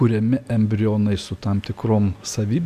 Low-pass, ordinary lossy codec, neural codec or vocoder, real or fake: 14.4 kHz; AAC, 96 kbps; none; real